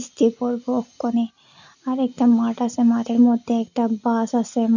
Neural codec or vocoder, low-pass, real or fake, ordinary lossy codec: none; 7.2 kHz; real; none